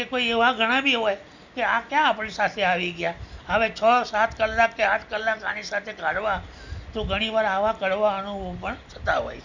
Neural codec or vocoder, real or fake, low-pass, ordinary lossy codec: none; real; 7.2 kHz; none